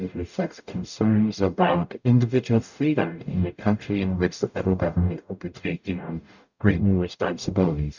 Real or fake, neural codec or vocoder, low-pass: fake; codec, 44.1 kHz, 0.9 kbps, DAC; 7.2 kHz